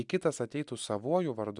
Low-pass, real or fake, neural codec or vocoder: 10.8 kHz; real; none